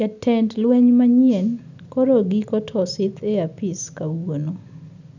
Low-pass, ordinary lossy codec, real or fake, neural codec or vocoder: 7.2 kHz; none; real; none